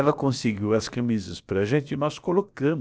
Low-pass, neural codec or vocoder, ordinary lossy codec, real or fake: none; codec, 16 kHz, about 1 kbps, DyCAST, with the encoder's durations; none; fake